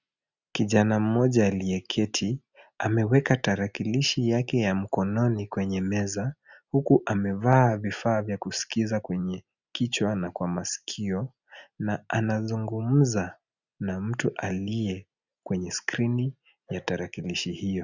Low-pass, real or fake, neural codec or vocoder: 7.2 kHz; real; none